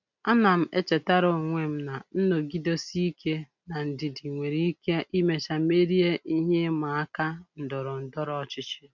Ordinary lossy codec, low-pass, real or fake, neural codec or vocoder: none; 7.2 kHz; real; none